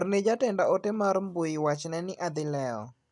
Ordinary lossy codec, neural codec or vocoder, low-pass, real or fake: none; none; 10.8 kHz; real